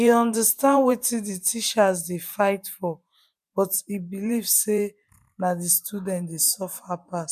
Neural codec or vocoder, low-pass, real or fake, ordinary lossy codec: vocoder, 44.1 kHz, 128 mel bands every 512 samples, BigVGAN v2; 14.4 kHz; fake; none